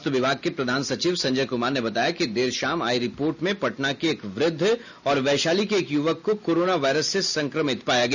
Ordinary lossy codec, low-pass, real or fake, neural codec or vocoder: none; 7.2 kHz; real; none